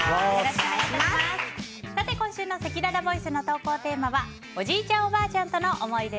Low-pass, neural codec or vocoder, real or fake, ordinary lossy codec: none; none; real; none